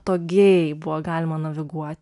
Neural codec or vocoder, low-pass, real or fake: none; 10.8 kHz; real